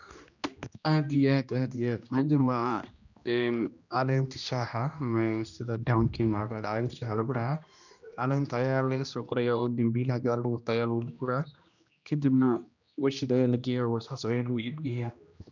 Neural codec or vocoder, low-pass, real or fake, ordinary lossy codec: codec, 16 kHz, 1 kbps, X-Codec, HuBERT features, trained on general audio; 7.2 kHz; fake; none